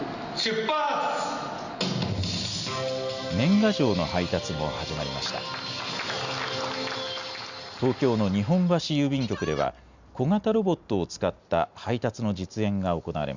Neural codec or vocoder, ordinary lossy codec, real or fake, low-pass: none; Opus, 64 kbps; real; 7.2 kHz